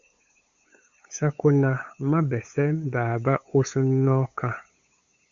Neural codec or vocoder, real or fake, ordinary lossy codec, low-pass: codec, 16 kHz, 8 kbps, FunCodec, trained on LibriTTS, 25 frames a second; fake; Opus, 64 kbps; 7.2 kHz